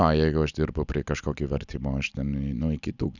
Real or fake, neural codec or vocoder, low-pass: real; none; 7.2 kHz